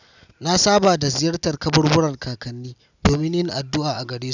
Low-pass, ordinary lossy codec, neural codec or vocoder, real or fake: 7.2 kHz; none; none; real